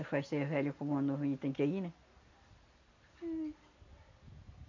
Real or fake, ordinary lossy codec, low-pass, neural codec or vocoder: real; MP3, 64 kbps; 7.2 kHz; none